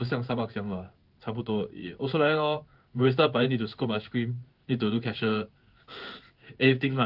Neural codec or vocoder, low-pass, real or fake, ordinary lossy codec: codec, 16 kHz in and 24 kHz out, 1 kbps, XY-Tokenizer; 5.4 kHz; fake; Opus, 32 kbps